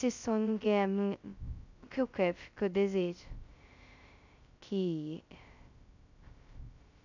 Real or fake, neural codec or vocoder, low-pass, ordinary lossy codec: fake; codec, 16 kHz, 0.2 kbps, FocalCodec; 7.2 kHz; none